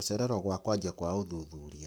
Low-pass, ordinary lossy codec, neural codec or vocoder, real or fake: none; none; codec, 44.1 kHz, 7.8 kbps, Pupu-Codec; fake